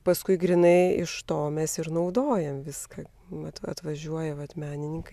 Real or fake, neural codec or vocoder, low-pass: real; none; 14.4 kHz